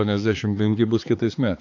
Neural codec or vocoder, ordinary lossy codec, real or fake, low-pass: codec, 16 kHz, 4 kbps, X-Codec, HuBERT features, trained on balanced general audio; AAC, 48 kbps; fake; 7.2 kHz